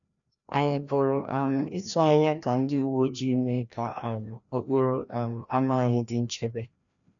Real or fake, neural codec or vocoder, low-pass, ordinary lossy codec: fake; codec, 16 kHz, 1 kbps, FreqCodec, larger model; 7.2 kHz; none